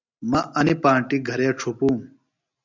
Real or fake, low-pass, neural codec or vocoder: real; 7.2 kHz; none